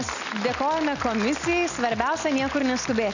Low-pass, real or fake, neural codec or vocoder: 7.2 kHz; real; none